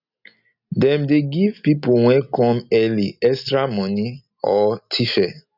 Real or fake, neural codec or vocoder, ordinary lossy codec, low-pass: real; none; none; 5.4 kHz